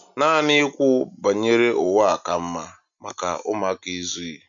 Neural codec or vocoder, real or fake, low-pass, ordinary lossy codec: none; real; 7.2 kHz; MP3, 96 kbps